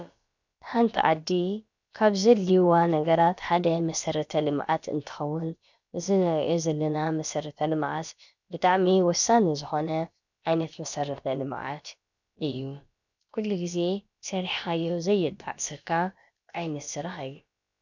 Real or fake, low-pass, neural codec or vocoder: fake; 7.2 kHz; codec, 16 kHz, about 1 kbps, DyCAST, with the encoder's durations